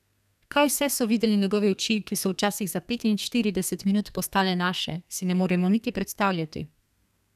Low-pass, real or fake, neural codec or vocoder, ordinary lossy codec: 14.4 kHz; fake; codec, 32 kHz, 1.9 kbps, SNAC; none